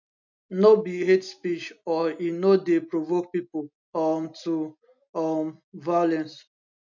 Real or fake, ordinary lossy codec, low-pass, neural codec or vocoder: real; none; 7.2 kHz; none